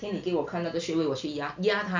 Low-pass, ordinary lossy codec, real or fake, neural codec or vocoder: 7.2 kHz; MP3, 64 kbps; real; none